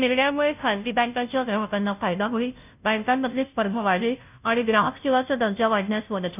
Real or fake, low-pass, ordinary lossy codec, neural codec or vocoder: fake; 3.6 kHz; none; codec, 16 kHz, 0.5 kbps, FunCodec, trained on Chinese and English, 25 frames a second